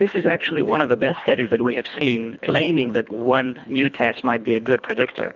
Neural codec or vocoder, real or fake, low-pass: codec, 24 kHz, 1.5 kbps, HILCodec; fake; 7.2 kHz